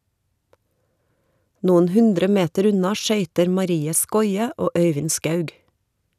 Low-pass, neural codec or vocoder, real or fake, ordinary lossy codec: 14.4 kHz; none; real; none